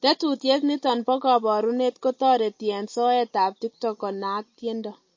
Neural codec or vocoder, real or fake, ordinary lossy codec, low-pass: none; real; MP3, 32 kbps; 7.2 kHz